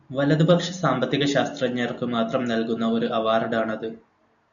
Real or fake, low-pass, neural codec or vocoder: real; 7.2 kHz; none